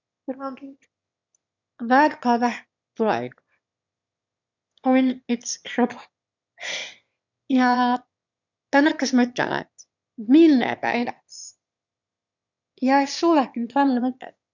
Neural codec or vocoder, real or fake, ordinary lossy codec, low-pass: autoencoder, 22.05 kHz, a latent of 192 numbers a frame, VITS, trained on one speaker; fake; none; 7.2 kHz